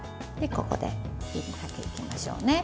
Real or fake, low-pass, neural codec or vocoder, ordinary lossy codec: real; none; none; none